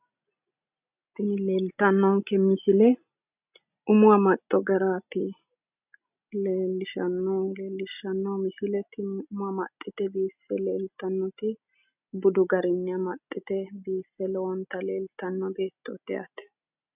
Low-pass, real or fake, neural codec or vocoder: 3.6 kHz; real; none